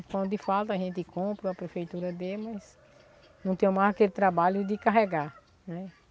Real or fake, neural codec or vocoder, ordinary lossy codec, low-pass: real; none; none; none